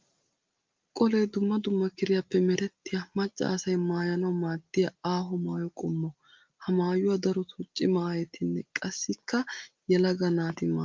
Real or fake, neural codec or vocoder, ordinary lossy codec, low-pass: real; none; Opus, 32 kbps; 7.2 kHz